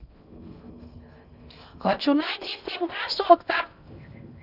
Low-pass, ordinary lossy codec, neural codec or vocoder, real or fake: 5.4 kHz; none; codec, 16 kHz in and 24 kHz out, 0.6 kbps, FocalCodec, streaming, 2048 codes; fake